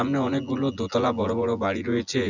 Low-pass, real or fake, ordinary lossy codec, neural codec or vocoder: 7.2 kHz; fake; none; vocoder, 24 kHz, 100 mel bands, Vocos